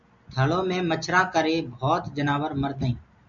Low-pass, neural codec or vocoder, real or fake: 7.2 kHz; none; real